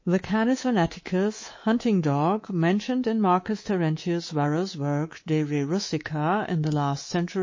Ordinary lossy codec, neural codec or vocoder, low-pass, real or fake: MP3, 32 kbps; codec, 24 kHz, 3.1 kbps, DualCodec; 7.2 kHz; fake